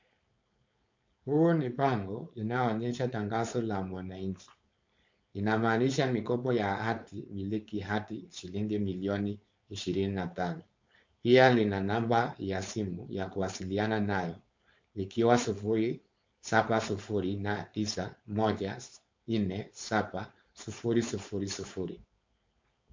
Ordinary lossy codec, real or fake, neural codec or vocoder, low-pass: MP3, 48 kbps; fake; codec, 16 kHz, 4.8 kbps, FACodec; 7.2 kHz